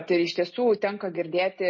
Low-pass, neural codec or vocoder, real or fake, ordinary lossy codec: 7.2 kHz; none; real; MP3, 32 kbps